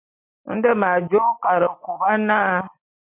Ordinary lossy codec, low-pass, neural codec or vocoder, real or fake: MP3, 32 kbps; 3.6 kHz; none; real